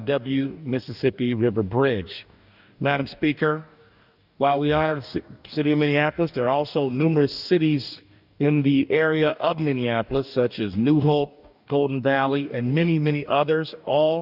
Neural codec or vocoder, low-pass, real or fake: codec, 44.1 kHz, 2.6 kbps, DAC; 5.4 kHz; fake